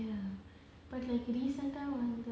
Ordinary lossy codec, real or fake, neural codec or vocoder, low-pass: none; real; none; none